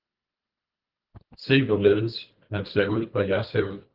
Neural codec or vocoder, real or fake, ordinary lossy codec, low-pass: codec, 24 kHz, 3 kbps, HILCodec; fake; Opus, 32 kbps; 5.4 kHz